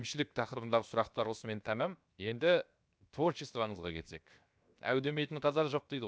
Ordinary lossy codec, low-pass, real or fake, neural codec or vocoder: none; none; fake; codec, 16 kHz, 0.7 kbps, FocalCodec